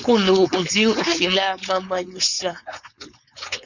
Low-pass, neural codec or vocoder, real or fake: 7.2 kHz; codec, 16 kHz, 4.8 kbps, FACodec; fake